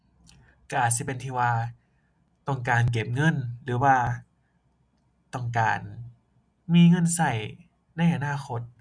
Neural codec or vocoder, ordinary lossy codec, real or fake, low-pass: none; none; real; 9.9 kHz